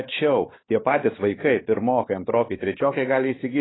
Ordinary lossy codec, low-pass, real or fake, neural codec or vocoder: AAC, 16 kbps; 7.2 kHz; fake; codec, 16 kHz, 4 kbps, X-Codec, WavLM features, trained on Multilingual LibriSpeech